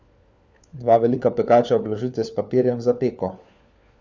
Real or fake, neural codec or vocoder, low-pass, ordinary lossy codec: fake; codec, 16 kHz, 4 kbps, FunCodec, trained on LibriTTS, 50 frames a second; none; none